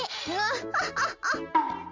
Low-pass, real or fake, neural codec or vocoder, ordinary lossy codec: 7.2 kHz; real; none; Opus, 32 kbps